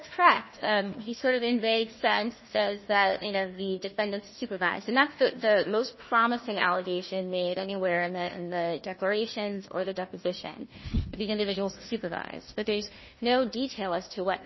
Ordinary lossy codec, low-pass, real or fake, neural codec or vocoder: MP3, 24 kbps; 7.2 kHz; fake; codec, 16 kHz, 1 kbps, FunCodec, trained on Chinese and English, 50 frames a second